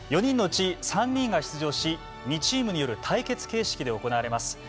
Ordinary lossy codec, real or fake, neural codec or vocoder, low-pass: none; real; none; none